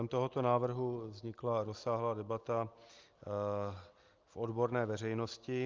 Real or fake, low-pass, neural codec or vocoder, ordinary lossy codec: real; 7.2 kHz; none; Opus, 32 kbps